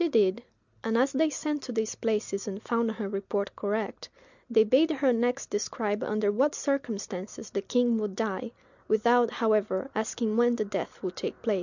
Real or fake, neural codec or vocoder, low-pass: real; none; 7.2 kHz